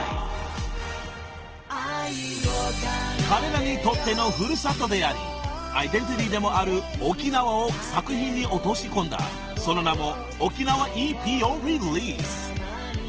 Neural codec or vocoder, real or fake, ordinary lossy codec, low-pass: none; real; Opus, 16 kbps; 7.2 kHz